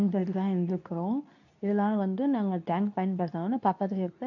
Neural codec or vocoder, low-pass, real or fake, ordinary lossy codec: codec, 24 kHz, 0.9 kbps, WavTokenizer, medium speech release version 2; 7.2 kHz; fake; none